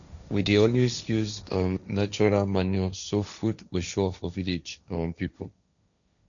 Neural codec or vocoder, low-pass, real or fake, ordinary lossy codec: codec, 16 kHz, 1.1 kbps, Voila-Tokenizer; 7.2 kHz; fake; none